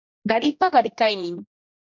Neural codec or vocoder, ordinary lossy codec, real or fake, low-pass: codec, 16 kHz, 1 kbps, X-Codec, HuBERT features, trained on general audio; MP3, 48 kbps; fake; 7.2 kHz